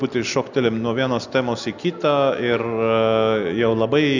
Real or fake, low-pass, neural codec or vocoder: real; 7.2 kHz; none